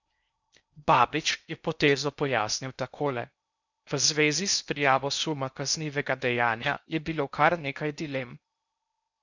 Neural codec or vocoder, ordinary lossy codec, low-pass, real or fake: codec, 16 kHz in and 24 kHz out, 0.6 kbps, FocalCodec, streaming, 4096 codes; none; 7.2 kHz; fake